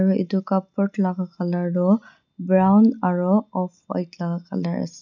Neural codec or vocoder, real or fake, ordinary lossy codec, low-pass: none; real; none; 7.2 kHz